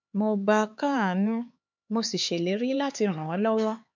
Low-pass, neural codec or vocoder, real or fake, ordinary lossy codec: 7.2 kHz; codec, 16 kHz, 4 kbps, X-Codec, HuBERT features, trained on LibriSpeech; fake; MP3, 64 kbps